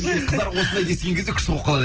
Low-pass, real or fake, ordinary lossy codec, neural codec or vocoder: 7.2 kHz; real; Opus, 16 kbps; none